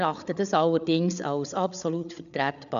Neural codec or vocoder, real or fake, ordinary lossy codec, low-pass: codec, 16 kHz, 8 kbps, FreqCodec, larger model; fake; none; 7.2 kHz